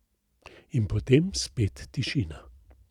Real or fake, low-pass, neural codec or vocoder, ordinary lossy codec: real; 19.8 kHz; none; none